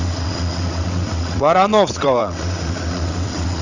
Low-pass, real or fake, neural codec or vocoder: 7.2 kHz; fake; vocoder, 22.05 kHz, 80 mel bands, WaveNeXt